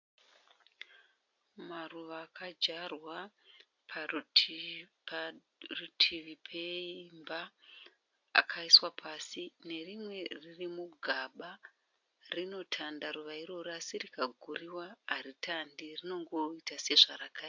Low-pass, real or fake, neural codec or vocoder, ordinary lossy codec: 7.2 kHz; real; none; AAC, 48 kbps